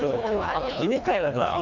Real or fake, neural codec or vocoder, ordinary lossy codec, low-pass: fake; codec, 24 kHz, 1.5 kbps, HILCodec; MP3, 64 kbps; 7.2 kHz